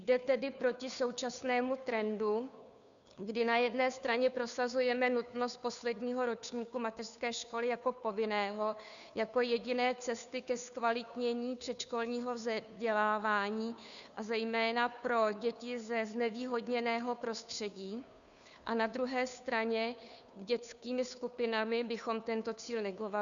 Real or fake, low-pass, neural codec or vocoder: fake; 7.2 kHz; codec, 16 kHz, 2 kbps, FunCodec, trained on Chinese and English, 25 frames a second